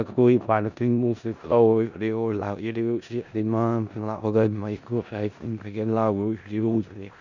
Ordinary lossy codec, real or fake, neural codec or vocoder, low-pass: none; fake; codec, 16 kHz in and 24 kHz out, 0.4 kbps, LongCat-Audio-Codec, four codebook decoder; 7.2 kHz